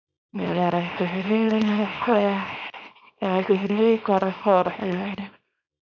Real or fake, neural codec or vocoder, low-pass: fake; codec, 24 kHz, 0.9 kbps, WavTokenizer, small release; 7.2 kHz